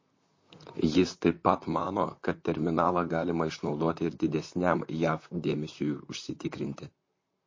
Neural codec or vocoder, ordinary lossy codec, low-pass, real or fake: vocoder, 44.1 kHz, 128 mel bands, Pupu-Vocoder; MP3, 32 kbps; 7.2 kHz; fake